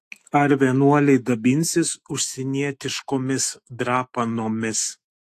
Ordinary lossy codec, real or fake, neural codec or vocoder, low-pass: AAC, 64 kbps; fake; autoencoder, 48 kHz, 128 numbers a frame, DAC-VAE, trained on Japanese speech; 14.4 kHz